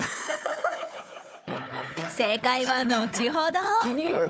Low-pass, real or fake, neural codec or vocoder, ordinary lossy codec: none; fake; codec, 16 kHz, 4 kbps, FunCodec, trained on Chinese and English, 50 frames a second; none